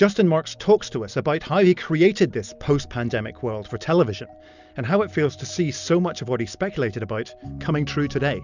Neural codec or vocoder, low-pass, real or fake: none; 7.2 kHz; real